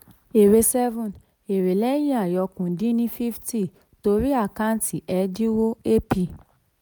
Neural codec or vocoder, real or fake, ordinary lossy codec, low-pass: none; real; none; none